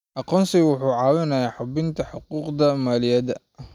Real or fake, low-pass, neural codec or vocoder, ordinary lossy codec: real; 19.8 kHz; none; none